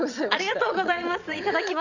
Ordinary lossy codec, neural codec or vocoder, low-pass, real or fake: none; vocoder, 22.05 kHz, 80 mel bands, WaveNeXt; 7.2 kHz; fake